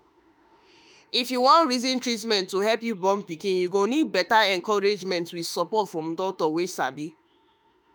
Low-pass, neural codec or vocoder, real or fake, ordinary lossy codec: none; autoencoder, 48 kHz, 32 numbers a frame, DAC-VAE, trained on Japanese speech; fake; none